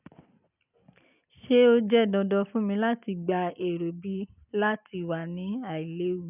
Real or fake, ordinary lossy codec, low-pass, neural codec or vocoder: real; none; 3.6 kHz; none